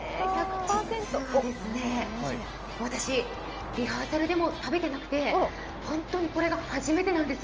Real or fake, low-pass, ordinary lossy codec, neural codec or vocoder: real; 7.2 kHz; Opus, 24 kbps; none